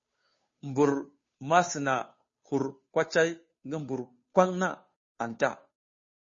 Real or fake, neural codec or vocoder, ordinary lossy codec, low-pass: fake; codec, 16 kHz, 2 kbps, FunCodec, trained on Chinese and English, 25 frames a second; MP3, 32 kbps; 7.2 kHz